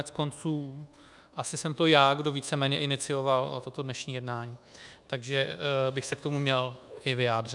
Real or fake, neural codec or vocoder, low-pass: fake; codec, 24 kHz, 1.2 kbps, DualCodec; 10.8 kHz